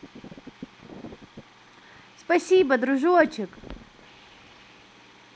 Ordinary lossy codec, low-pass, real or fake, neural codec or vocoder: none; none; real; none